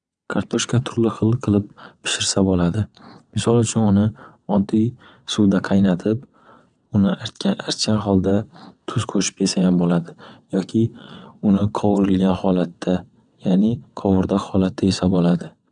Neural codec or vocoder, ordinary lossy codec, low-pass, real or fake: vocoder, 22.05 kHz, 80 mel bands, WaveNeXt; none; 9.9 kHz; fake